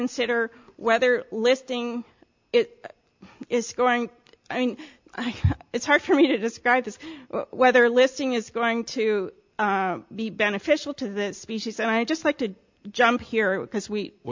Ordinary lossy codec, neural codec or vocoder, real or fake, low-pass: MP3, 64 kbps; none; real; 7.2 kHz